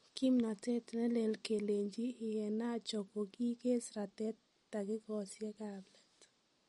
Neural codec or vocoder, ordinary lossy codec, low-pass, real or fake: none; MP3, 48 kbps; 19.8 kHz; real